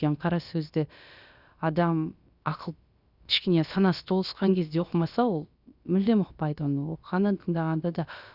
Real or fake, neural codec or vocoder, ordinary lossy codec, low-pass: fake; codec, 16 kHz, about 1 kbps, DyCAST, with the encoder's durations; Opus, 64 kbps; 5.4 kHz